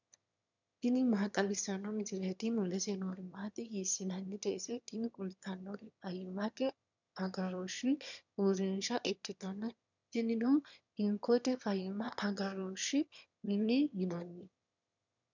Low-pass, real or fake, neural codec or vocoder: 7.2 kHz; fake; autoencoder, 22.05 kHz, a latent of 192 numbers a frame, VITS, trained on one speaker